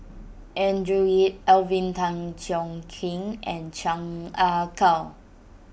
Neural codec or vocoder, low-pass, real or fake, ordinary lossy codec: none; none; real; none